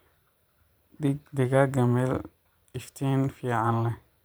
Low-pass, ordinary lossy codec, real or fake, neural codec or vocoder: none; none; fake; vocoder, 44.1 kHz, 128 mel bands every 512 samples, BigVGAN v2